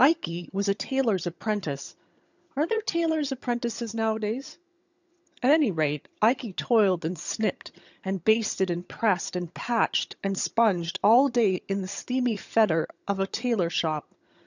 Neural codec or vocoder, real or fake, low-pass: vocoder, 22.05 kHz, 80 mel bands, HiFi-GAN; fake; 7.2 kHz